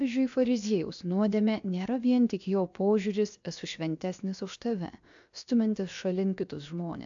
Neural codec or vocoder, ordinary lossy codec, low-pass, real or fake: codec, 16 kHz, 0.7 kbps, FocalCodec; AAC, 64 kbps; 7.2 kHz; fake